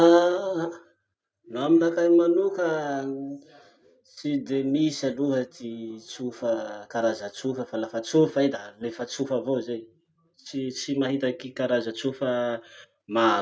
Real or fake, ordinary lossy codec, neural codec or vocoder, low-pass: real; none; none; none